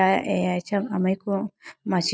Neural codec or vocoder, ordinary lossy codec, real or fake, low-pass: none; none; real; none